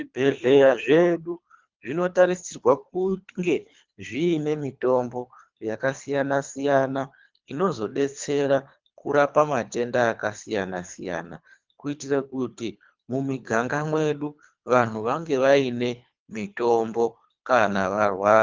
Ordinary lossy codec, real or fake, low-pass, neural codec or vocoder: Opus, 24 kbps; fake; 7.2 kHz; codec, 24 kHz, 3 kbps, HILCodec